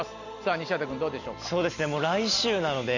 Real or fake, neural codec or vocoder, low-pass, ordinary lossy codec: real; none; 7.2 kHz; none